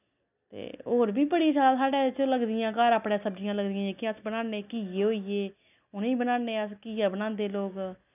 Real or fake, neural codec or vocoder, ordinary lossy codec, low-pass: real; none; none; 3.6 kHz